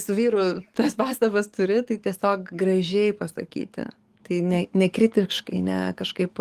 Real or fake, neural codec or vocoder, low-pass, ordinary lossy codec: fake; codec, 44.1 kHz, 7.8 kbps, DAC; 14.4 kHz; Opus, 24 kbps